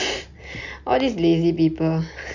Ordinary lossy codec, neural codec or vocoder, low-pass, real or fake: none; none; 7.2 kHz; real